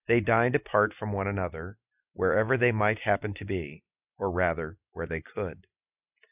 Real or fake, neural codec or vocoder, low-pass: real; none; 3.6 kHz